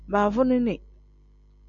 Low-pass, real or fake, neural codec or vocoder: 7.2 kHz; real; none